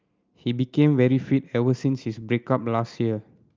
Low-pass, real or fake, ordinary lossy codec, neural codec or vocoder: 7.2 kHz; real; Opus, 24 kbps; none